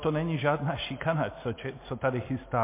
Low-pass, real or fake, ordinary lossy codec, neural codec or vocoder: 3.6 kHz; real; MP3, 24 kbps; none